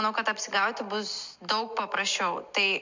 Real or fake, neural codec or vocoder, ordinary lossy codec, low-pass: real; none; MP3, 64 kbps; 7.2 kHz